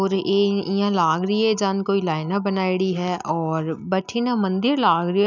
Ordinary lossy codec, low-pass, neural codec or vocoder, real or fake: none; 7.2 kHz; none; real